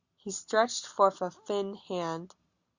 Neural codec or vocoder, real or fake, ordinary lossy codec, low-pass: none; real; Opus, 64 kbps; 7.2 kHz